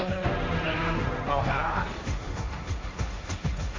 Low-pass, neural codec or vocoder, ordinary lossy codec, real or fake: 7.2 kHz; codec, 16 kHz, 1.1 kbps, Voila-Tokenizer; AAC, 32 kbps; fake